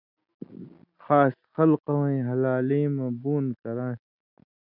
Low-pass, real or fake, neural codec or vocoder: 5.4 kHz; real; none